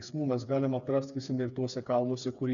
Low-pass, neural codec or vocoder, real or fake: 7.2 kHz; codec, 16 kHz, 4 kbps, FreqCodec, smaller model; fake